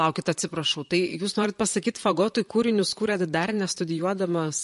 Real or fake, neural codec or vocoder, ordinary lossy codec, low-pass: fake; vocoder, 44.1 kHz, 128 mel bands, Pupu-Vocoder; MP3, 48 kbps; 14.4 kHz